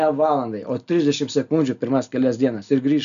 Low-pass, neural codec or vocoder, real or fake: 7.2 kHz; none; real